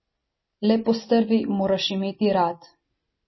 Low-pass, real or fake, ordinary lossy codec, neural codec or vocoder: 7.2 kHz; real; MP3, 24 kbps; none